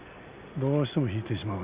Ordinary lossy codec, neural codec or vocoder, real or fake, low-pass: Opus, 64 kbps; none; real; 3.6 kHz